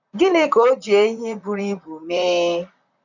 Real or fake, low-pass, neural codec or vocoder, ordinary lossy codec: fake; 7.2 kHz; vocoder, 44.1 kHz, 128 mel bands, Pupu-Vocoder; none